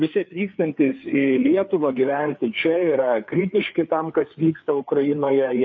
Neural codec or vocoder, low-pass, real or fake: codec, 16 kHz, 4 kbps, FreqCodec, larger model; 7.2 kHz; fake